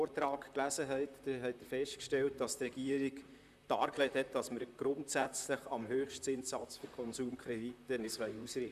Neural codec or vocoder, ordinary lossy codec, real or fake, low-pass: vocoder, 44.1 kHz, 128 mel bands, Pupu-Vocoder; none; fake; 14.4 kHz